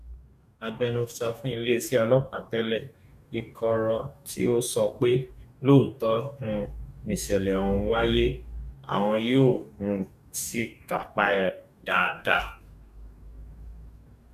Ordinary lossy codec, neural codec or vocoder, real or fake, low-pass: none; codec, 44.1 kHz, 2.6 kbps, DAC; fake; 14.4 kHz